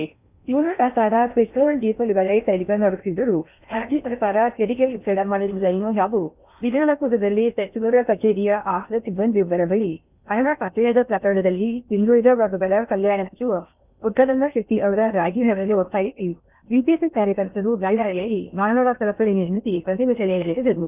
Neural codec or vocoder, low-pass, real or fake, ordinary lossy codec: codec, 16 kHz in and 24 kHz out, 0.6 kbps, FocalCodec, streaming, 4096 codes; 3.6 kHz; fake; none